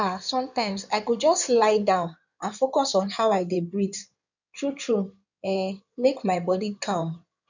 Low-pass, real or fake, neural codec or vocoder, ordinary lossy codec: 7.2 kHz; fake; codec, 16 kHz in and 24 kHz out, 2.2 kbps, FireRedTTS-2 codec; none